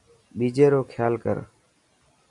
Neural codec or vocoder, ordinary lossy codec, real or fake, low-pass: none; AAC, 64 kbps; real; 10.8 kHz